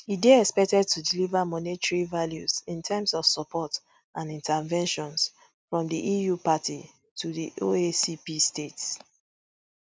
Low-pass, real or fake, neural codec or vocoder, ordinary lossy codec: none; real; none; none